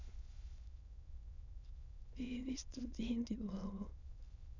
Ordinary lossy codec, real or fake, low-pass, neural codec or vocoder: none; fake; 7.2 kHz; autoencoder, 22.05 kHz, a latent of 192 numbers a frame, VITS, trained on many speakers